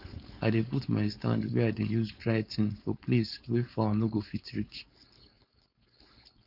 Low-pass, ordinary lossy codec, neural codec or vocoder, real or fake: 5.4 kHz; none; codec, 16 kHz, 4.8 kbps, FACodec; fake